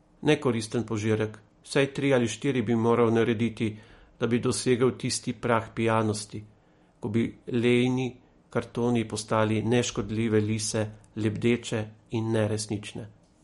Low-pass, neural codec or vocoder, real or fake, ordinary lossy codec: 19.8 kHz; none; real; MP3, 48 kbps